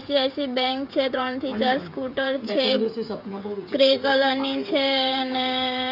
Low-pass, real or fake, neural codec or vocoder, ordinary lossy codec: 5.4 kHz; fake; codec, 16 kHz, 16 kbps, FreqCodec, smaller model; AAC, 48 kbps